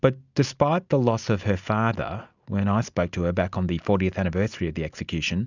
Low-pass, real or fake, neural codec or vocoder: 7.2 kHz; real; none